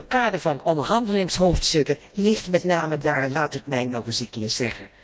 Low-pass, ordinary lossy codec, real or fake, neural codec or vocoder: none; none; fake; codec, 16 kHz, 1 kbps, FreqCodec, smaller model